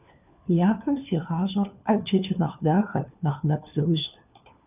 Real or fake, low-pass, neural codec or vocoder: fake; 3.6 kHz; codec, 16 kHz, 4 kbps, FunCodec, trained on LibriTTS, 50 frames a second